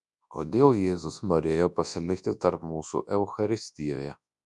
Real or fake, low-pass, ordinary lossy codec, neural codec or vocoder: fake; 10.8 kHz; MP3, 96 kbps; codec, 24 kHz, 0.9 kbps, WavTokenizer, large speech release